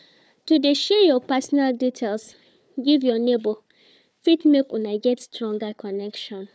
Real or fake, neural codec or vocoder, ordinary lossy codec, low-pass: fake; codec, 16 kHz, 4 kbps, FunCodec, trained on Chinese and English, 50 frames a second; none; none